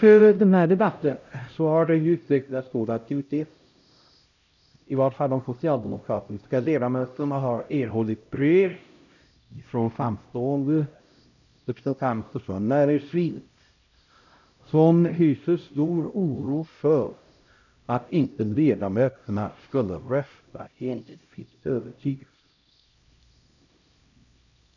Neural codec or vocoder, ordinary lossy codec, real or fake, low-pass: codec, 16 kHz, 0.5 kbps, X-Codec, HuBERT features, trained on LibriSpeech; none; fake; 7.2 kHz